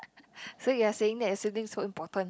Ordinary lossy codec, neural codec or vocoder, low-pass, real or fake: none; none; none; real